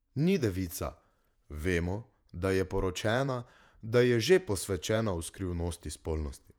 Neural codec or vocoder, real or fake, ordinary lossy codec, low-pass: vocoder, 48 kHz, 128 mel bands, Vocos; fake; none; 19.8 kHz